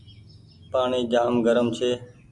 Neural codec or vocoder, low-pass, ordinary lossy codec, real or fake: none; 10.8 kHz; MP3, 64 kbps; real